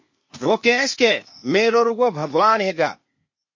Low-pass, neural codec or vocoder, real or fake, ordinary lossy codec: 7.2 kHz; codec, 16 kHz, 0.8 kbps, ZipCodec; fake; MP3, 32 kbps